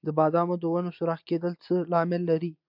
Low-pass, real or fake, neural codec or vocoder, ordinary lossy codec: 5.4 kHz; real; none; MP3, 48 kbps